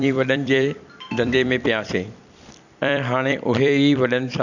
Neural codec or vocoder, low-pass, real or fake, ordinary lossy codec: vocoder, 44.1 kHz, 128 mel bands every 256 samples, BigVGAN v2; 7.2 kHz; fake; none